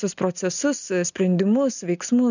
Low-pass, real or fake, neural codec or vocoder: 7.2 kHz; real; none